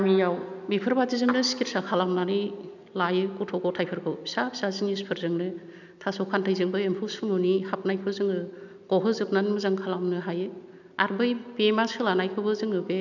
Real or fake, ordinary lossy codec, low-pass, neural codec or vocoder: real; none; 7.2 kHz; none